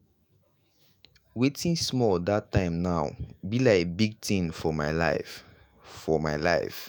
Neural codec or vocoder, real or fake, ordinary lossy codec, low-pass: autoencoder, 48 kHz, 128 numbers a frame, DAC-VAE, trained on Japanese speech; fake; none; none